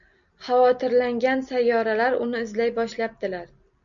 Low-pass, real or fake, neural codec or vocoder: 7.2 kHz; real; none